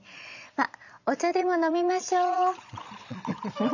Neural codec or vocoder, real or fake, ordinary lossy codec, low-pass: vocoder, 22.05 kHz, 80 mel bands, Vocos; fake; none; 7.2 kHz